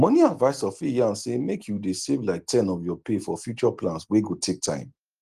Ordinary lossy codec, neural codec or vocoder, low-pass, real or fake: Opus, 24 kbps; none; 14.4 kHz; real